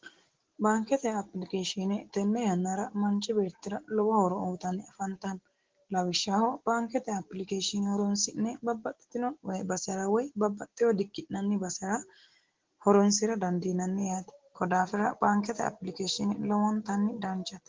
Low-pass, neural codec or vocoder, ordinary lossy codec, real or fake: 7.2 kHz; none; Opus, 16 kbps; real